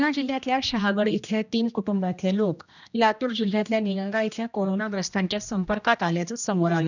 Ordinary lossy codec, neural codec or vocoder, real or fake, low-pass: none; codec, 16 kHz, 1 kbps, X-Codec, HuBERT features, trained on general audio; fake; 7.2 kHz